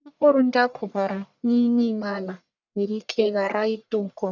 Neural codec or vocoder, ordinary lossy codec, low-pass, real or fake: codec, 44.1 kHz, 1.7 kbps, Pupu-Codec; none; 7.2 kHz; fake